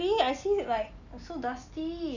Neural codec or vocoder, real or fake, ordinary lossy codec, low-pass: none; real; none; 7.2 kHz